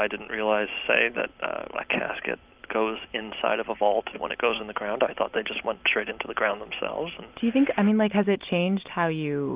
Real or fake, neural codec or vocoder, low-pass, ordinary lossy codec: real; none; 3.6 kHz; Opus, 32 kbps